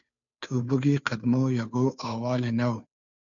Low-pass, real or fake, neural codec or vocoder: 7.2 kHz; fake; codec, 16 kHz, 2 kbps, FunCodec, trained on Chinese and English, 25 frames a second